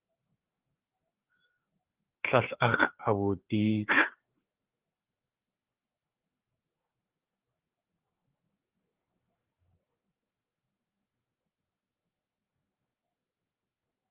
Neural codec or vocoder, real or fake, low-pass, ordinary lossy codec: codec, 16 kHz, 4 kbps, FreqCodec, larger model; fake; 3.6 kHz; Opus, 16 kbps